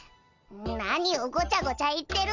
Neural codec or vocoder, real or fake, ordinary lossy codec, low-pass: none; real; none; 7.2 kHz